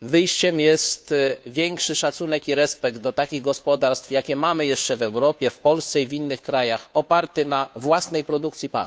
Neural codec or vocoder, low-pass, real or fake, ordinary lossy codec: codec, 16 kHz, 2 kbps, FunCodec, trained on Chinese and English, 25 frames a second; none; fake; none